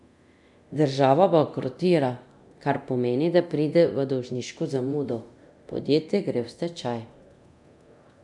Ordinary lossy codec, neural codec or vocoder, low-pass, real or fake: none; codec, 24 kHz, 0.9 kbps, DualCodec; 10.8 kHz; fake